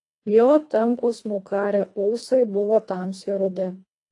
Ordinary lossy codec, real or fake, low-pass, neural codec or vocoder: AAC, 48 kbps; fake; 10.8 kHz; codec, 24 kHz, 1.5 kbps, HILCodec